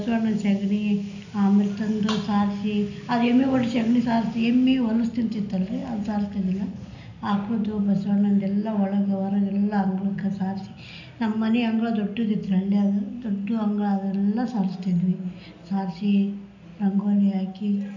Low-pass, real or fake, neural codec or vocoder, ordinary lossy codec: 7.2 kHz; real; none; none